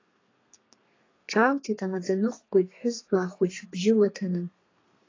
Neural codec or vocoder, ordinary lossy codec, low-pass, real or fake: codec, 32 kHz, 1.9 kbps, SNAC; AAC, 32 kbps; 7.2 kHz; fake